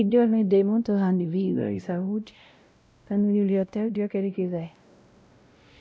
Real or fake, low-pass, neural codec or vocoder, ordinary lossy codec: fake; none; codec, 16 kHz, 0.5 kbps, X-Codec, WavLM features, trained on Multilingual LibriSpeech; none